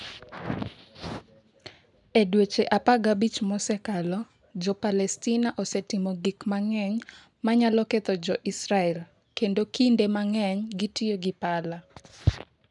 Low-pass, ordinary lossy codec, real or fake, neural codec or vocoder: 10.8 kHz; none; real; none